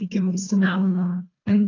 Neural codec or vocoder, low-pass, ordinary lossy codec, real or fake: codec, 24 kHz, 1.5 kbps, HILCodec; 7.2 kHz; AAC, 32 kbps; fake